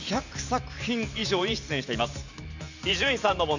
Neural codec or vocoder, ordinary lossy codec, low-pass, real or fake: vocoder, 44.1 kHz, 128 mel bands every 512 samples, BigVGAN v2; none; 7.2 kHz; fake